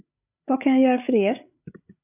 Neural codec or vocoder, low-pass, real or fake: codec, 16 kHz, 8 kbps, FreqCodec, larger model; 3.6 kHz; fake